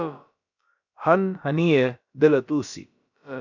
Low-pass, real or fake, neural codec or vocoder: 7.2 kHz; fake; codec, 16 kHz, about 1 kbps, DyCAST, with the encoder's durations